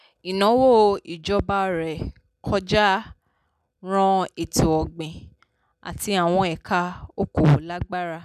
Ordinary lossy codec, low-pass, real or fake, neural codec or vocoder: none; 14.4 kHz; real; none